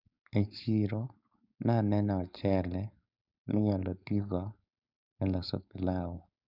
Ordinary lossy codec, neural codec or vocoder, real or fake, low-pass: none; codec, 16 kHz, 4.8 kbps, FACodec; fake; 5.4 kHz